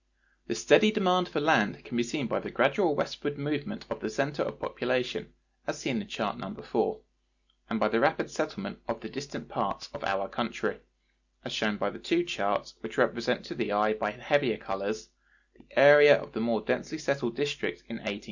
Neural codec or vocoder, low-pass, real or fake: none; 7.2 kHz; real